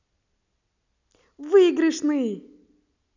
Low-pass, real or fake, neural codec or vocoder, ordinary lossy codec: 7.2 kHz; real; none; none